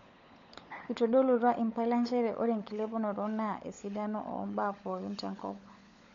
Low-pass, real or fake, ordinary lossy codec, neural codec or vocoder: 7.2 kHz; fake; MP3, 48 kbps; codec, 16 kHz, 16 kbps, FunCodec, trained on LibriTTS, 50 frames a second